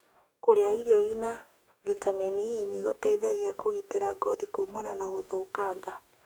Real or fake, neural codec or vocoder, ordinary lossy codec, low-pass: fake; codec, 44.1 kHz, 2.6 kbps, DAC; none; none